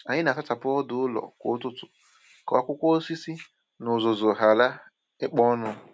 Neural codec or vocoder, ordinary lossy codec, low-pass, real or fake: none; none; none; real